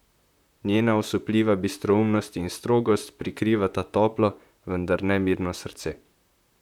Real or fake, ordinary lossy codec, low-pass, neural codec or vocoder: fake; none; 19.8 kHz; vocoder, 44.1 kHz, 128 mel bands, Pupu-Vocoder